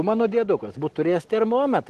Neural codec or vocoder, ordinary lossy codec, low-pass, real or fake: none; Opus, 16 kbps; 14.4 kHz; real